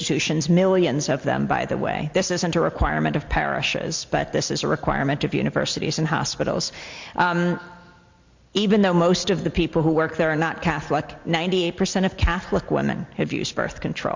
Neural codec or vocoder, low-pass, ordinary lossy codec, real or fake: none; 7.2 kHz; MP3, 48 kbps; real